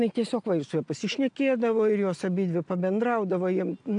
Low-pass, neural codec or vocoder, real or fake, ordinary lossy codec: 9.9 kHz; none; real; AAC, 64 kbps